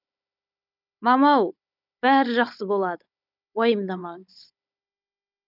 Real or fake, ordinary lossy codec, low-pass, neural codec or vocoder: fake; none; 5.4 kHz; codec, 16 kHz, 16 kbps, FunCodec, trained on Chinese and English, 50 frames a second